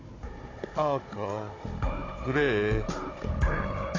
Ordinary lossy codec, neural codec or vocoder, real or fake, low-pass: AAC, 32 kbps; codec, 16 kHz, 16 kbps, FunCodec, trained on Chinese and English, 50 frames a second; fake; 7.2 kHz